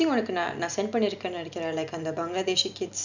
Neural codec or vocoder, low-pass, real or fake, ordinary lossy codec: none; 7.2 kHz; real; none